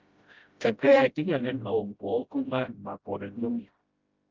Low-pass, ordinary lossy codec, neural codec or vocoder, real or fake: 7.2 kHz; Opus, 24 kbps; codec, 16 kHz, 0.5 kbps, FreqCodec, smaller model; fake